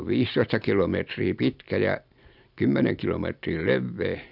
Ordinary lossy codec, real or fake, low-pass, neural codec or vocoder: none; real; 5.4 kHz; none